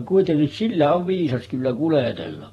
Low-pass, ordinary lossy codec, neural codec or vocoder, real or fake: 19.8 kHz; AAC, 32 kbps; vocoder, 44.1 kHz, 128 mel bands every 512 samples, BigVGAN v2; fake